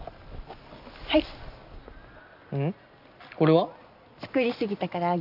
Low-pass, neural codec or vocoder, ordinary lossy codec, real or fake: 5.4 kHz; none; AAC, 48 kbps; real